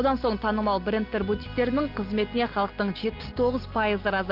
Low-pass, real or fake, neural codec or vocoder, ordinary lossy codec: 5.4 kHz; real; none; Opus, 32 kbps